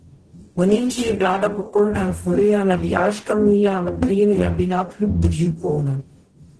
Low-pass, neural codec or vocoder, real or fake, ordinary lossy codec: 10.8 kHz; codec, 44.1 kHz, 0.9 kbps, DAC; fake; Opus, 16 kbps